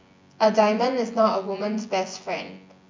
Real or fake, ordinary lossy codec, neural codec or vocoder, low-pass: fake; MP3, 64 kbps; vocoder, 24 kHz, 100 mel bands, Vocos; 7.2 kHz